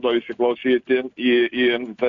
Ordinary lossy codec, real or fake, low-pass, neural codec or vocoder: AAC, 64 kbps; real; 7.2 kHz; none